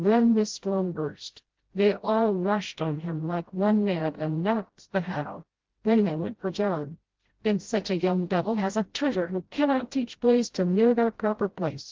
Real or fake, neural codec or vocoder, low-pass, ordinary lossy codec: fake; codec, 16 kHz, 0.5 kbps, FreqCodec, smaller model; 7.2 kHz; Opus, 16 kbps